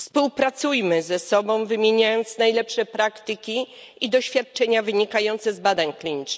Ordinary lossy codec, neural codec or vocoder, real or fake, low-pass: none; none; real; none